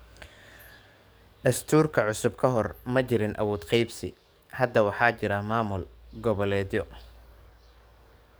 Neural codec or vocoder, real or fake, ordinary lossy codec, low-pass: codec, 44.1 kHz, 7.8 kbps, DAC; fake; none; none